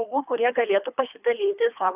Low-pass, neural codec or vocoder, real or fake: 3.6 kHz; codec, 24 kHz, 3 kbps, HILCodec; fake